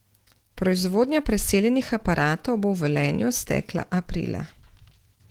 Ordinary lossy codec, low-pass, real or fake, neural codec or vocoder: Opus, 16 kbps; 19.8 kHz; fake; autoencoder, 48 kHz, 128 numbers a frame, DAC-VAE, trained on Japanese speech